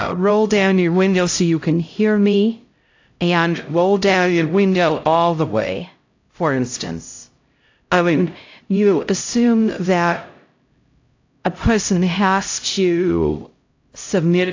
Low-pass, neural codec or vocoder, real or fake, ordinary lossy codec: 7.2 kHz; codec, 16 kHz, 0.5 kbps, X-Codec, HuBERT features, trained on LibriSpeech; fake; AAC, 48 kbps